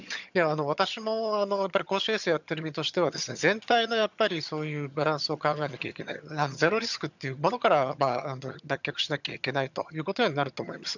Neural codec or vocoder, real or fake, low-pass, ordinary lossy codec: vocoder, 22.05 kHz, 80 mel bands, HiFi-GAN; fake; 7.2 kHz; none